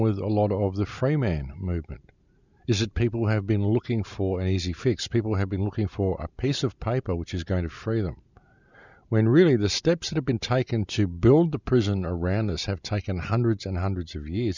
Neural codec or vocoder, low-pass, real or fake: vocoder, 44.1 kHz, 128 mel bands every 512 samples, BigVGAN v2; 7.2 kHz; fake